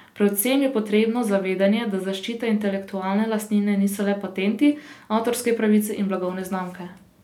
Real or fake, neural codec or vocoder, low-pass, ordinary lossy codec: real; none; 19.8 kHz; none